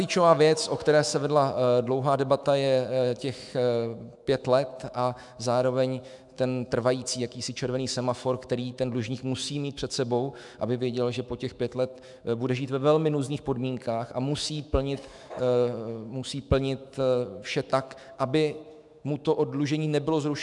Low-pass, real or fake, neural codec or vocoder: 10.8 kHz; fake; autoencoder, 48 kHz, 128 numbers a frame, DAC-VAE, trained on Japanese speech